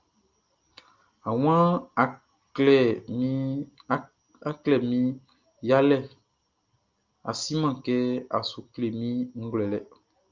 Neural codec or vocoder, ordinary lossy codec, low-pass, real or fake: none; Opus, 24 kbps; 7.2 kHz; real